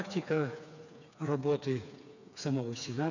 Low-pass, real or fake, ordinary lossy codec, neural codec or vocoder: 7.2 kHz; fake; none; codec, 16 kHz, 4 kbps, FreqCodec, smaller model